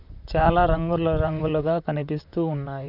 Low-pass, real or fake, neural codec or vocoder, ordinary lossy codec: 5.4 kHz; fake; vocoder, 44.1 kHz, 128 mel bands, Pupu-Vocoder; none